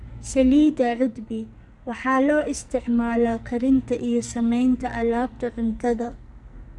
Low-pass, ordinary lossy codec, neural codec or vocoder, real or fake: 10.8 kHz; none; codec, 44.1 kHz, 2.6 kbps, SNAC; fake